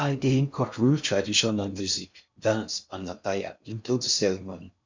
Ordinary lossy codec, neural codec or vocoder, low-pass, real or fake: MP3, 64 kbps; codec, 16 kHz in and 24 kHz out, 0.6 kbps, FocalCodec, streaming, 4096 codes; 7.2 kHz; fake